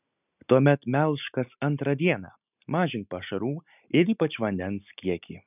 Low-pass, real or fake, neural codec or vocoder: 3.6 kHz; real; none